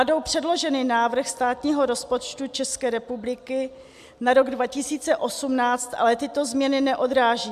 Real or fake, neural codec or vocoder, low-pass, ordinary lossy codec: real; none; 14.4 kHz; AAC, 96 kbps